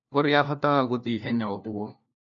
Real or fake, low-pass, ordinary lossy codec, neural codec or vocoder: fake; 7.2 kHz; none; codec, 16 kHz, 1 kbps, FunCodec, trained on LibriTTS, 50 frames a second